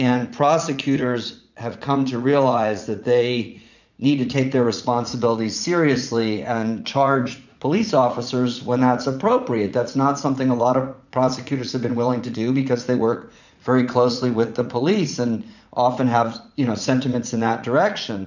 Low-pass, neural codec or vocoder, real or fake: 7.2 kHz; vocoder, 44.1 kHz, 80 mel bands, Vocos; fake